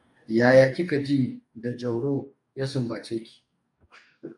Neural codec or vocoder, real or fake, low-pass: codec, 44.1 kHz, 2.6 kbps, DAC; fake; 10.8 kHz